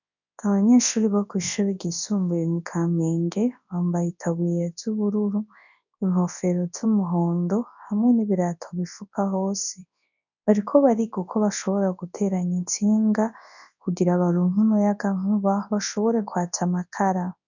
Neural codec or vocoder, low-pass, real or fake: codec, 24 kHz, 0.9 kbps, WavTokenizer, large speech release; 7.2 kHz; fake